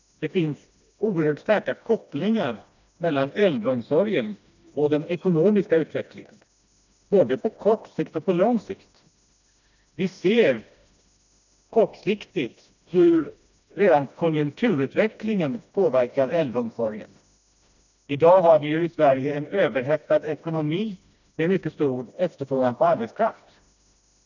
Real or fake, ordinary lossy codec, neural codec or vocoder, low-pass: fake; none; codec, 16 kHz, 1 kbps, FreqCodec, smaller model; 7.2 kHz